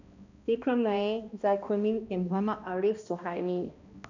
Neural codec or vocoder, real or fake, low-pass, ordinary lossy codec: codec, 16 kHz, 1 kbps, X-Codec, HuBERT features, trained on balanced general audio; fake; 7.2 kHz; none